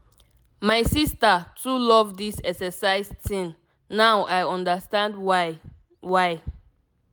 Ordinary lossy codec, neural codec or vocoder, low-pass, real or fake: none; none; none; real